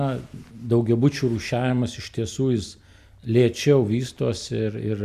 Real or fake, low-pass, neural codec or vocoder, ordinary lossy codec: real; 14.4 kHz; none; MP3, 96 kbps